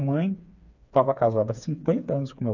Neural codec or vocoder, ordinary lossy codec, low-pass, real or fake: codec, 16 kHz, 4 kbps, FreqCodec, smaller model; none; 7.2 kHz; fake